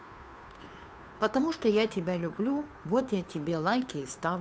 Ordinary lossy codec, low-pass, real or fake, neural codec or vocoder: none; none; fake; codec, 16 kHz, 2 kbps, FunCodec, trained on Chinese and English, 25 frames a second